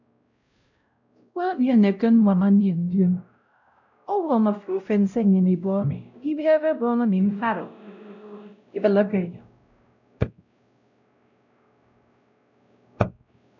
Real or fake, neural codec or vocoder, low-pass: fake; codec, 16 kHz, 0.5 kbps, X-Codec, WavLM features, trained on Multilingual LibriSpeech; 7.2 kHz